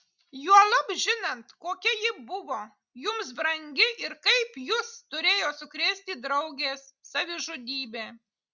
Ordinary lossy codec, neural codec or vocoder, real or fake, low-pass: Opus, 64 kbps; none; real; 7.2 kHz